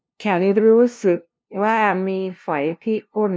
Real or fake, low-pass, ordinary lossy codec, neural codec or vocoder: fake; none; none; codec, 16 kHz, 0.5 kbps, FunCodec, trained on LibriTTS, 25 frames a second